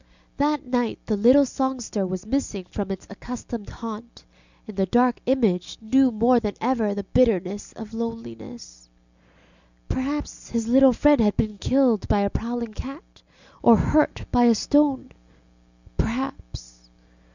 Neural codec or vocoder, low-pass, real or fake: none; 7.2 kHz; real